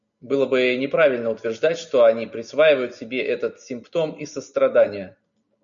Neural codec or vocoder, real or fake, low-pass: none; real; 7.2 kHz